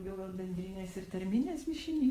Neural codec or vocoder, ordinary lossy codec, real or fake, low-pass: vocoder, 48 kHz, 128 mel bands, Vocos; Opus, 24 kbps; fake; 14.4 kHz